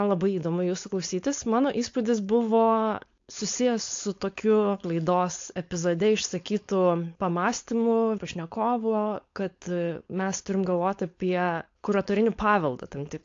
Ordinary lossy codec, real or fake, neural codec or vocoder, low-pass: AAC, 48 kbps; fake; codec, 16 kHz, 4.8 kbps, FACodec; 7.2 kHz